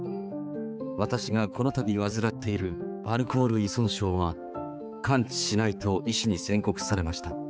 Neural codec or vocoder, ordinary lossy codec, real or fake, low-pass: codec, 16 kHz, 4 kbps, X-Codec, HuBERT features, trained on balanced general audio; none; fake; none